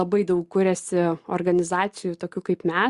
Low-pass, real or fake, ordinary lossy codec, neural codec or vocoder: 10.8 kHz; real; Opus, 64 kbps; none